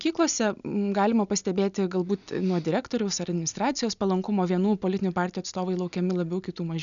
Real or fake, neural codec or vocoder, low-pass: real; none; 7.2 kHz